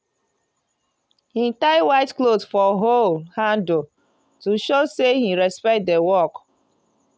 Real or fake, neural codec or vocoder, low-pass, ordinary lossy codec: real; none; none; none